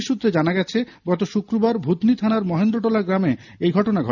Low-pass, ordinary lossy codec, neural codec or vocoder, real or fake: 7.2 kHz; none; none; real